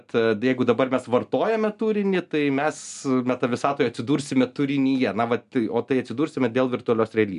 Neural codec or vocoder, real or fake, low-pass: none; real; 10.8 kHz